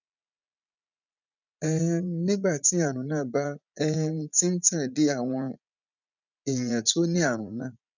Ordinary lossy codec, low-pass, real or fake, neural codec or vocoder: none; 7.2 kHz; fake; vocoder, 44.1 kHz, 80 mel bands, Vocos